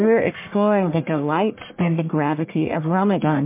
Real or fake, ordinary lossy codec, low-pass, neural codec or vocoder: fake; MP3, 24 kbps; 3.6 kHz; codec, 44.1 kHz, 1.7 kbps, Pupu-Codec